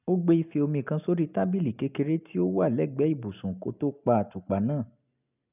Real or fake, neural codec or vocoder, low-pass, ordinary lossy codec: real; none; 3.6 kHz; none